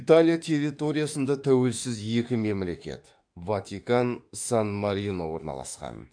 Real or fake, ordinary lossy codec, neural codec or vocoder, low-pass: fake; AAC, 64 kbps; autoencoder, 48 kHz, 32 numbers a frame, DAC-VAE, trained on Japanese speech; 9.9 kHz